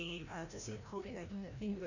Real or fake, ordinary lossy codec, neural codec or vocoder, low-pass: fake; none; codec, 16 kHz, 1 kbps, FreqCodec, larger model; 7.2 kHz